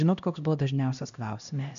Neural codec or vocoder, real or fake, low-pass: codec, 16 kHz, 1 kbps, X-Codec, HuBERT features, trained on LibriSpeech; fake; 7.2 kHz